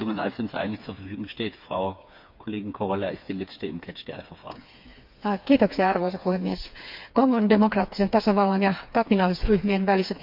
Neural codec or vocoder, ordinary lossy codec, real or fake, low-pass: codec, 16 kHz, 4 kbps, FreqCodec, smaller model; MP3, 48 kbps; fake; 5.4 kHz